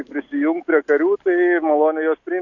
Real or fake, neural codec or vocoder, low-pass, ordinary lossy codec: real; none; 7.2 kHz; AAC, 48 kbps